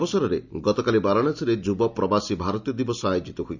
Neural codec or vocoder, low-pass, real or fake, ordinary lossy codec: none; 7.2 kHz; real; none